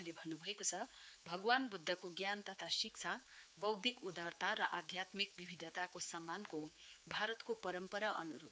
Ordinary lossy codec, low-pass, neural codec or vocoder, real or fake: none; none; codec, 16 kHz, 4 kbps, X-Codec, HuBERT features, trained on general audio; fake